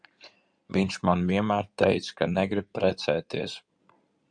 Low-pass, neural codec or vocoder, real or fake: 9.9 kHz; codec, 16 kHz in and 24 kHz out, 2.2 kbps, FireRedTTS-2 codec; fake